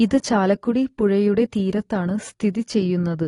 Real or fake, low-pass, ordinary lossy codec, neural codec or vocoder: real; 10.8 kHz; AAC, 32 kbps; none